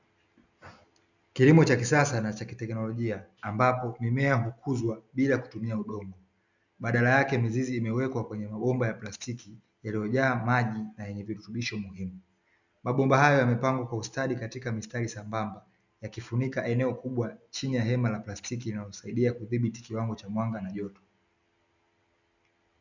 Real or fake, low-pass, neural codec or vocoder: real; 7.2 kHz; none